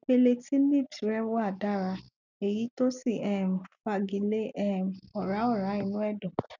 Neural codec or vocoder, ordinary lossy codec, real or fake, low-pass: none; none; real; 7.2 kHz